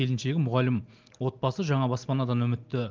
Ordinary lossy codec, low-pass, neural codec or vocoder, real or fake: Opus, 32 kbps; 7.2 kHz; none; real